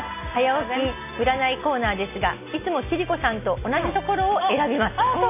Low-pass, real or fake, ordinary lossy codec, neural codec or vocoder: 3.6 kHz; real; none; none